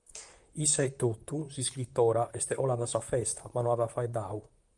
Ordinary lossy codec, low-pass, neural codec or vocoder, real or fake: Opus, 32 kbps; 10.8 kHz; vocoder, 44.1 kHz, 128 mel bands, Pupu-Vocoder; fake